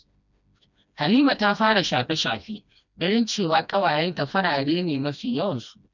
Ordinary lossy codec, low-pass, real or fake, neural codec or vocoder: none; 7.2 kHz; fake; codec, 16 kHz, 1 kbps, FreqCodec, smaller model